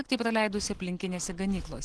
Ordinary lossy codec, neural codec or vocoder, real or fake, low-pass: Opus, 16 kbps; none; real; 10.8 kHz